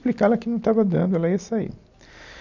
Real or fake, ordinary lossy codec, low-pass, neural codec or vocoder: real; none; 7.2 kHz; none